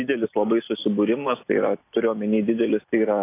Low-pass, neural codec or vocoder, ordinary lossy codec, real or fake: 3.6 kHz; none; AAC, 24 kbps; real